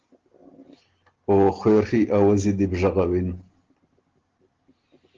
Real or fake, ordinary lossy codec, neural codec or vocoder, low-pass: real; Opus, 16 kbps; none; 7.2 kHz